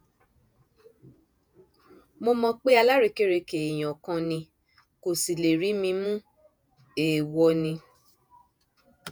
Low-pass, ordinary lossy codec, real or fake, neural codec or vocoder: none; none; fake; vocoder, 48 kHz, 128 mel bands, Vocos